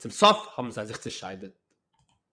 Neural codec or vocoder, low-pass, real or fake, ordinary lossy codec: vocoder, 22.05 kHz, 80 mel bands, WaveNeXt; 9.9 kHz; fake; MP3, 96 kbps